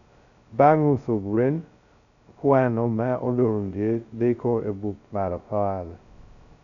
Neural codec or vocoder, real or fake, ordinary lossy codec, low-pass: codec, 16 kHz, 0.2 kbps, FocalCodec; fake; none; 7.2 kHz